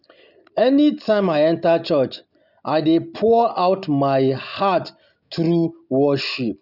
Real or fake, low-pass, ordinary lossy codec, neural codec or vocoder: real; 5.4 kHz; none; none